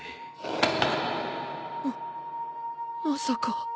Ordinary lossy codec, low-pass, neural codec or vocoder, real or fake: none; none; none; real